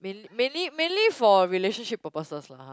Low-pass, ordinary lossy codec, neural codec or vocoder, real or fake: none; none; none; real